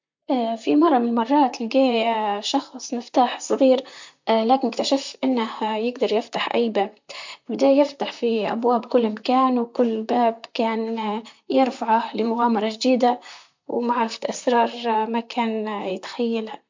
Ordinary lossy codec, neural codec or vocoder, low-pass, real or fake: MP3, 48 kbps; vocoder, 44.1 kHz, 128 mel bands, Pupu-Vocoder; 7.2 kHz; fake